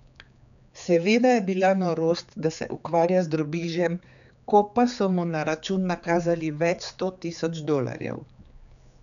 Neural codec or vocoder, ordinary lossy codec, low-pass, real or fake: codec, 16 kHz, 4 kbps, X-Codec, HuBERT features, trained on general audio; none; 7.2 kHz; fake